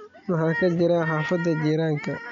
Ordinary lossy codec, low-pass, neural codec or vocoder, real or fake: MP3, 96 kbps; 7.2 kHz; none; real